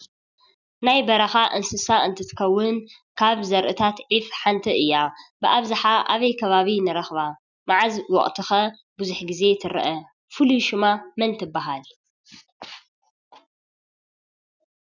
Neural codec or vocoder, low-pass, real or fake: none; 7.2 kHz; real